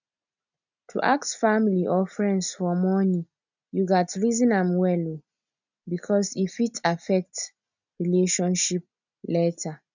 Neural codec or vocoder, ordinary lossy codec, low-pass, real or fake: none; none; 7.2 kHz; real